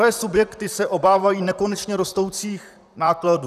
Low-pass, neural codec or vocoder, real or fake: 14.4 kHz; vocoder, 44.1 kHz, 128 mel bands, Pupu-Vocoder; fake